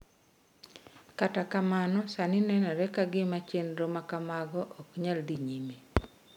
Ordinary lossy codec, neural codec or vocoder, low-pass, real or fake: none; none; 19.8 kHz; real